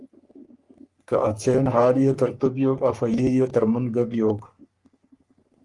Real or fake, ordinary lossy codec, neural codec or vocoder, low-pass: fake; Opus, 32 kbps; codec, 44.1 kHz, 3.4 kbps, Pupu-Codec; 10.8 kHz